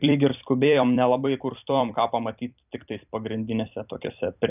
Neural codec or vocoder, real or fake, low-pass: vocoder, 44.1 kHz, 128 mel bands every 256 samples, BigVGAN v2; fake; 3.6 kHz